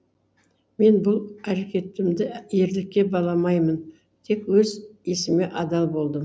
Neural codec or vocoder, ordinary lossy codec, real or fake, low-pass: none; none; real; none